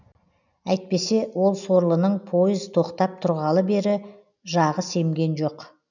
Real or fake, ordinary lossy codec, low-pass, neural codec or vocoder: real; none; 7.2 kHz; none